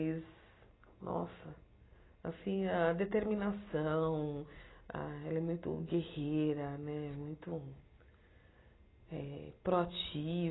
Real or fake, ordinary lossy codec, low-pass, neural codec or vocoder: real; AAC, 16 kbps; 7.2 kHz; none